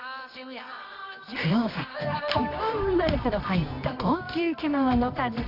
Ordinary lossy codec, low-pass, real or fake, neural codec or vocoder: none; 5.4 kHz; fake; codec, 24 kHz, 0.9 kbps, WavTokenizer, medium music audio release